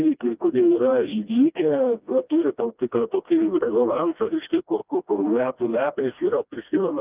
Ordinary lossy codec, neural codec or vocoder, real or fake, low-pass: Opus, 24 kbps; codec, 16 kHz, 1 kbps, FreqCodec, smaller model; fake; 3.6 kHz